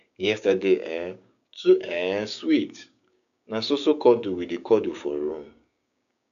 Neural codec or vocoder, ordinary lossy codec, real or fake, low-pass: codec, 16 kHz, 6 kbps, DAC; none; fake; 7.2 kHz